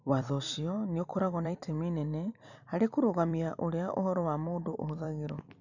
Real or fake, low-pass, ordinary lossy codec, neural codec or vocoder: fake; 7.2 kHz; none; vocoder, 44.1 kHz, 128 mel bands every 256 samples, BigVGAN v2